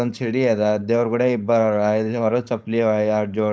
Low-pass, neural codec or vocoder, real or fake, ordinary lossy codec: none; codec, 16 kHz, 4.8 kbps, FACodec; fake; none